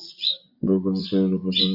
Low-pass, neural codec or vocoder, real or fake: 5.4 kHz; vocoder, 24 kHz, 100 mel bands, Vocos; fake